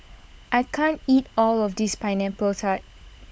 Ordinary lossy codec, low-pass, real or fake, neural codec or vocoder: none; none; fake; codec, 16 kHz, 16 kbps, FunCodec, trained on LibriTTS, 50 frames a second